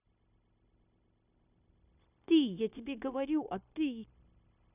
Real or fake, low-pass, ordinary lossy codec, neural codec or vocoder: fake; 3.6 kHz; none; codec, 16 kHz, 0.9 kbps, LongCat-Audio-Codec